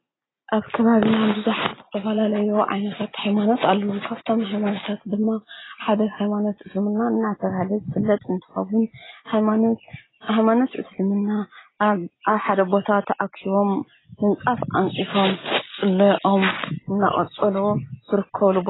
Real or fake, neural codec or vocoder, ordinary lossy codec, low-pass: real; none; AAC, 16 kbps; 7.2 kHz